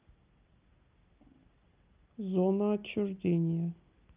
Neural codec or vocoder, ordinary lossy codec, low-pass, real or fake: none; Opus, 32 kbps; 3.6 kHz; real